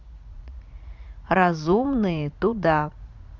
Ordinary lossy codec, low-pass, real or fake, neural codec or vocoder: none; 7.2 kHz; fake; vocoder, 44.1 kHz, 80 mel bands, Vocos